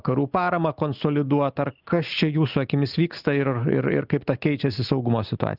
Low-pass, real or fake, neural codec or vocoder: 5.4 kHz; real; none